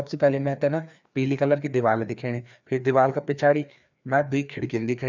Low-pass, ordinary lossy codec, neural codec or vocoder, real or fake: 7.2 kHz; none; codec, 16 kHz, 2 kbps, FreqCodec, larger model; fake